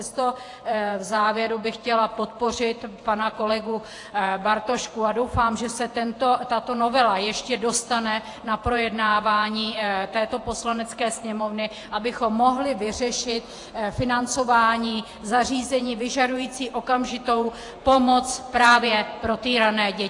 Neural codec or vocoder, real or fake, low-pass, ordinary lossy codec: vocoder, 48 kHz, 128 mel bands, Vocos; fake; 10.8 kHz; AAC, 48 kbps